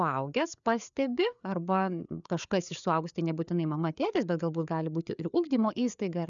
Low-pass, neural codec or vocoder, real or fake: 7.2 kHz; codec, 16 kHz, 4 kbps, FreqCodec, larger model; fake